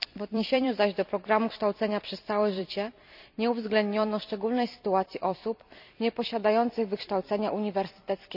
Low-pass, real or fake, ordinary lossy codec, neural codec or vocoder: 5.4 kHz; fake; none; vocoder, 44.1 kHz, 128 mel bands every 256 samples, BigVGAN v2